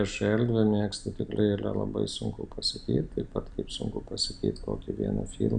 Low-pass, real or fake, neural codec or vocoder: 9.9 kHz; real; none